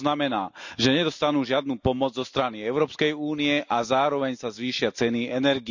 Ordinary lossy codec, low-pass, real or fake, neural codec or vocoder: none; 7.2 kHz; real; none